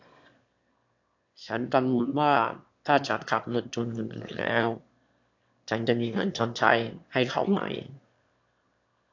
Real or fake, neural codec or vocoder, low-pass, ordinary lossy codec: fake; autoencoder, 22.05 kHz, a latent of 192 numbers a frame, VITS, trained on one speaker; 7.2 kHz; AAC, 48 kbps